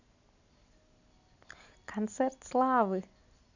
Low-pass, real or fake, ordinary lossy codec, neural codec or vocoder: 7.2 kHz; real; none; none